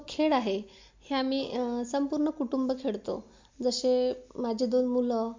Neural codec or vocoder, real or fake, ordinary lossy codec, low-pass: none; real; MP3, 48 kbps; 7.2 kHz